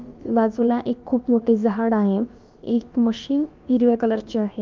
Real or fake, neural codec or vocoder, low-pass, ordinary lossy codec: fake; codec, 16 kHz, about 1 kbps, DyCAST, with the encoder's durations; 7.2 kHz; Opus, 24 kbps